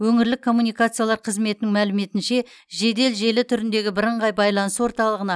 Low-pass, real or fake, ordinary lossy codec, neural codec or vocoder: none; real; none; none